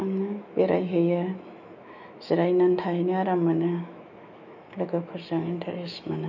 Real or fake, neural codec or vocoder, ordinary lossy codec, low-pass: fake; autoencoder, 48 kHz, 128 numbers a frame, DAC-VAE, trained on Japanese speech; none; 7.2 kHz